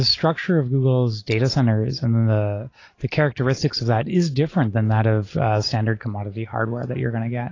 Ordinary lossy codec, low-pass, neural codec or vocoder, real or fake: AAC, 32 kbps; 7.2 kHz; none; real